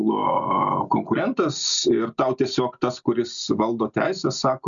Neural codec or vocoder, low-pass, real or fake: none; 7.2 kHz; real